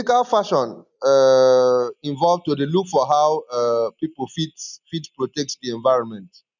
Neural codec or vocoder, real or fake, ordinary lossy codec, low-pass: none; real; none; 7.2 kHz